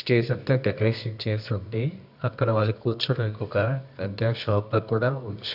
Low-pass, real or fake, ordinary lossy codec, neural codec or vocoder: 5.4 kHz; fake; none; codec, 24 kHz, 0.9 kbps, WavTokenizer, medium music audio release